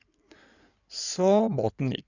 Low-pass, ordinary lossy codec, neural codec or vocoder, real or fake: 7.2 kHz; none; codec, 16 kHz in and 24 kHz out, 2.2 kbps, FireRedTTS-2 codec; fake